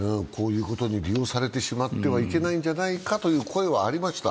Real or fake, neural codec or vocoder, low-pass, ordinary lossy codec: real; none; none; none